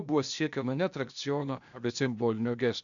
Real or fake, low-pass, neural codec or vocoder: fake; 7.2 kHz; codec, 16 kHz, 0.8 kbps, ZipCodec